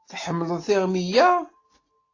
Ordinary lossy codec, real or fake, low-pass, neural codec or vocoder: AAC, 32 kbps; real; 7.2 kHz; none